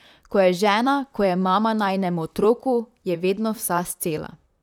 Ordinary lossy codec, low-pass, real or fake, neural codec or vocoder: none; 19.8 kHz; fake; vocoder, 44.1 kHz, 128 mel bands, Pupu-Vocoder